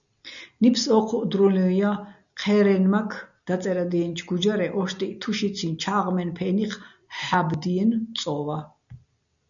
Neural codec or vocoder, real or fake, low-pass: none; real; 7.2 kHz